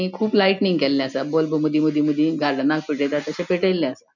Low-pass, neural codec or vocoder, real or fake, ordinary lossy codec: 7.2 kHz; none; real; none